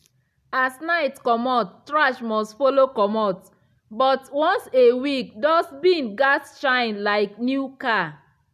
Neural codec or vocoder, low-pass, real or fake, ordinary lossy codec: none; 14.4 kHz; real; none